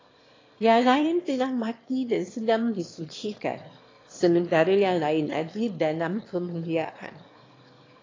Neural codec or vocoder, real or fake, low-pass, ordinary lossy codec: autoencoder, 22.05 kHz, a latent of 192 numbers a frame, VITS, trained on one speaker; fake; 7.2 kHz; AAC, 32 kbps